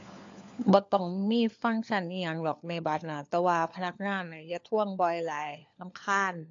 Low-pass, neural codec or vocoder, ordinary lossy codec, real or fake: 7.2 kHz; codec, 16 kHz, 4 kbps, FunCodec, trained on LibriTTS, 50 frames a second; AAC, 48 kbps; fake